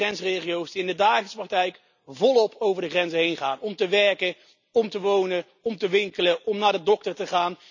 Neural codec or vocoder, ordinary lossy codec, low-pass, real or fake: none; none; 7.2 kHz; real